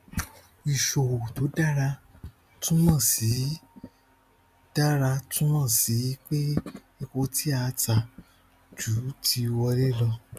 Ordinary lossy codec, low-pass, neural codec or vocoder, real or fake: none; 14.4 kHz; none; real